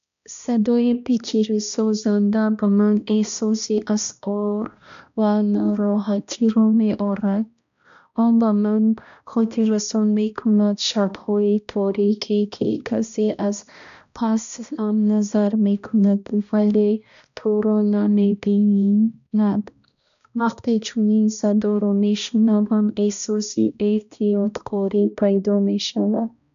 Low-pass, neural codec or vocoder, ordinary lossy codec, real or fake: 7.2 kHz; codec, 16 kHz, 1 kbps, X-Codec, HuBERT features, trained on balanced general audio; none; fake